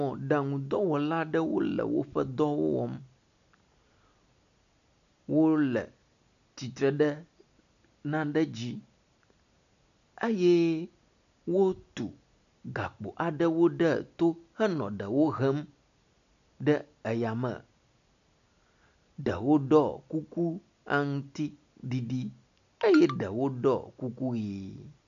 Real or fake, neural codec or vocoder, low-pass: real; none; 7.2 kHz